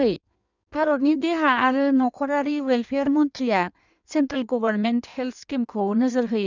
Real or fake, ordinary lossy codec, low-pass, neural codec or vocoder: fake; none; 7.2 kHz; codec, 16 kHz in and 24 kHz out, 1.1 kbps, FireRedTTS-2 codec